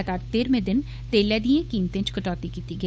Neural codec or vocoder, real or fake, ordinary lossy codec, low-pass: codec, 16 kHz, 8 kbps, FunCodec, trained on Chinese and English, 25 frames a second; fake; none; none